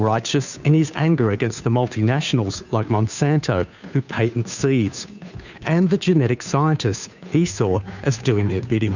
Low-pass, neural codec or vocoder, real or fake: 7.2 kHz; codec, 16 kHz, 2 kbps, FunCodec, trained on Chinese and English, 25 frames a second; fake